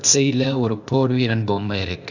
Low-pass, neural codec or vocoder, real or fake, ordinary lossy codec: 7.2 kHz; codec, 16 kHz, 0.8 kbps, ZipCodec; fake; none